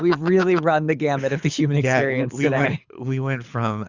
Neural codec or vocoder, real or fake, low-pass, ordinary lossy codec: codec, 24 kHz, 6 kbps, HILCodec; fake; 7.2 kHz; Opus, 64 kbps